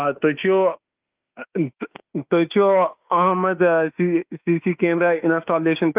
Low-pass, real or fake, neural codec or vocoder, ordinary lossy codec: 3.6 kHz; fake; autoencoder, 48 kHz, 32 numbers a frame, DAC-VAE, trained on Japanese speech; Opus, 32 kbps